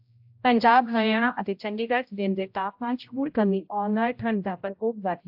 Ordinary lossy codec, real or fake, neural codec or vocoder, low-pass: AAC, 48 kbps; fake; codec, 16 kHz, 0.5 kbps, X-Codec, HuBERT features, trained on general audio; 5.4 kHz